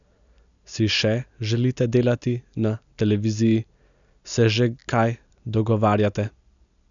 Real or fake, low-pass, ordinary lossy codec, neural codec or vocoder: real; 7.2 kHz; none; none